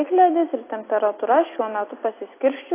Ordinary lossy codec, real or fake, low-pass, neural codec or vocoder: AAC, 24 kbps; real; 3.6 kHz; none